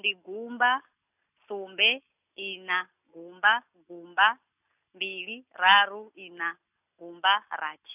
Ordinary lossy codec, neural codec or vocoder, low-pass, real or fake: none; none; 3.6 kHz; real